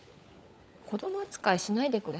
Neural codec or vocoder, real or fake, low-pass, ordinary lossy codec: codec, 16 kHz, 16 kbps, FunCodec, trained on LibriTTS, 50 frames a second; fake; none; none